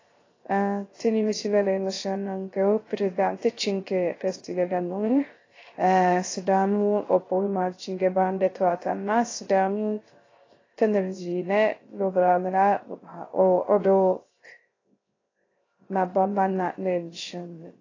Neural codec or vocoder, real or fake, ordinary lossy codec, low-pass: codec, 16 kHz, 0.3 kbps, FocalCodec; fake; AAC, 32 kbps; 7.2 kHz